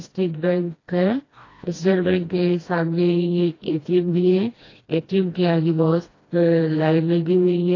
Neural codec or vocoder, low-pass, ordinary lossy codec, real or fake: codec, 16 kHz, 1 kbps, FreqCodec, smaller model; 7.2 kHz; AAC, 32 kbps; fake